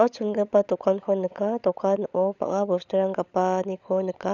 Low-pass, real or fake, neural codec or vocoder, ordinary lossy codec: 7.2 kHz; fake; codec, 16 kHz, 16 kbps, FunCodec, trained on LibriTTS, 50 frames a second; none